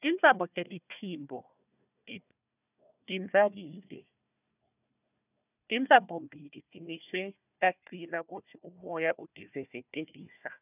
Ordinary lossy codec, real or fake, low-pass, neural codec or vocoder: none; fake; 3.6 kHz; codec, 16 kHz, 1 kbps, FunCodec, trained on Chinese and English, 50 frames a second